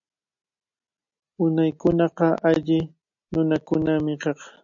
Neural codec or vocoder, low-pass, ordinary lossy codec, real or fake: none; 7.2 kHz; AAC, 64 kbps; real